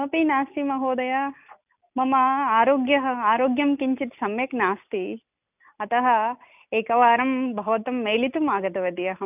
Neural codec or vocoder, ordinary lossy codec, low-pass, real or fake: none; none; 3.6 kHz; real